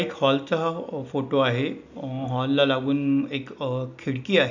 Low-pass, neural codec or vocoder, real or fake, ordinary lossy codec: 7.2 kHz; none; real; none